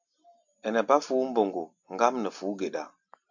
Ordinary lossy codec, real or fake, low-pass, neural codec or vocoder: AAC, 48 kbps; real; 7.2 kHz; none